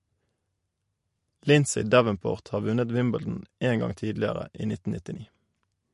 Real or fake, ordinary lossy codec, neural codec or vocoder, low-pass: real; MP3, 48 kbps; none; 14.4 kHz